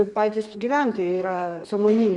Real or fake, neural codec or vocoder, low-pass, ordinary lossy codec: fake; codec, 32 kHz, 1.9 kbps, SNAC; 10.8 kHz; Opus, 64 kbps